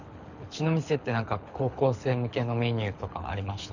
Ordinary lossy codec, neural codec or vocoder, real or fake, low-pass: none; codec, 24 kHz, 6 kbps, HILCodec; fake; 7.2 kHz